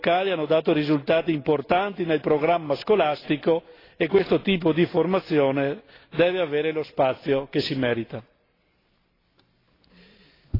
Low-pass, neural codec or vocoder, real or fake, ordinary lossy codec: 5.4 kHz; none; real; AAC, 24 kbps